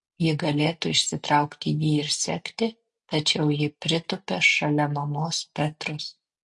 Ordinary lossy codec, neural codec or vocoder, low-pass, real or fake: MP3, 48 kbps; none; 10.8 kHz; real